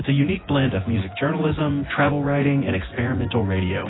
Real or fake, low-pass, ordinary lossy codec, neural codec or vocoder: fake; 7.2 kHz; AAC, 16 kbps; vocoder, 24 kHz, 100 mel bands, Vocos